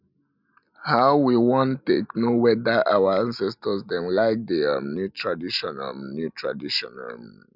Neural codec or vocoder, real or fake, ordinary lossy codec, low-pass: none; real; none; 5.4 kHz